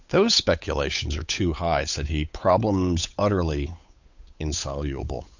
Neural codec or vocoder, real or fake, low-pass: codec, 16 kHz, 8 kbps, FunCodec, trained on Chinese and English, 25 frames a second; fake; 7.2 kHz